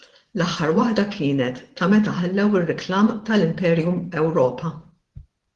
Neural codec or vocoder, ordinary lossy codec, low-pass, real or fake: vocoder, 24 kHz, 100 mel bands, Vocos; Opus, 16 kbps; 10.8 kHz; fake